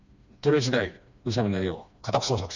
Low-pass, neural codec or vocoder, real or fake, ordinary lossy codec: 7.2 kHz; codec, 16 kHz, 2 kbps, FreqCodec, smaller model; fake; none